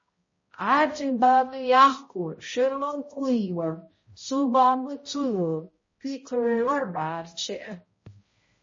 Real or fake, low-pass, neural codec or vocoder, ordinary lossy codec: fake; 7.2 kHz; codec, 16 kHz, 0.5 kbps, X-Codec, HuBERT features, trained on balanced general audio; MP3, 32 kbps